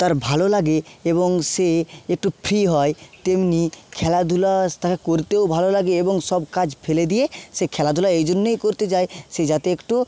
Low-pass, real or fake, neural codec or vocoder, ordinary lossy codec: none; real; none; none